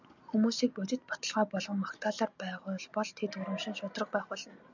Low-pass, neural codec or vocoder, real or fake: 7.2 kHz; none; real